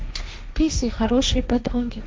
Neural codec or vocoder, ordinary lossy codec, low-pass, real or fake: codec, 16 kHz, 1.1 kbps, Voila-Tokenizer; none; none; fake